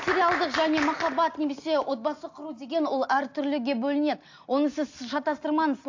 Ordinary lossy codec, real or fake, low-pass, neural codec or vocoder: AAC, 48 kbps; real; 7.2 kHz; none